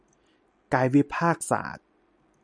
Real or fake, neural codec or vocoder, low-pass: real; none; 9.9 kHz